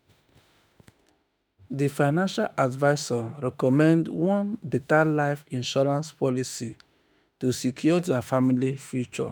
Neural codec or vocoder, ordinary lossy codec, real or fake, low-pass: autoencoder, 48 kHz, 32 numbers a frame, DAC-VAE, trained on Japanese speech; none; fake; none